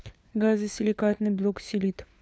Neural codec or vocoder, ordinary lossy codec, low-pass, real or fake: codec, 16 kHz, 4 kbps, FunCodec, trained on LibriTTS, 50 frames a second; none; none; fake